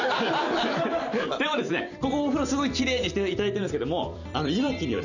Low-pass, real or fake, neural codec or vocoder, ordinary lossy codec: 7.2 kHz; fake; vocoder, 44.1 kHz, 128 mel bands every 256 samples, BigVGAN v2; none